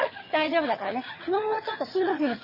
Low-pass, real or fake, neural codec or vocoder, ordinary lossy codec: 5.4 kHz; fake; vocoder, 22.05 kHz, 80 mel bands, HiFi-GAN; MP3, 24 kbps